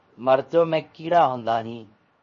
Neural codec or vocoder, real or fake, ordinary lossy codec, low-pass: codec, 16 kHz, 0.7 kbps, FocalCodec; fake; MP3, 32 kbps; 7.2 kHz